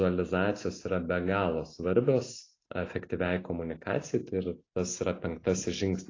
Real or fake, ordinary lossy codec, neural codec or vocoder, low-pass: real; AAC, 32 kbps; none; 7.2 kHz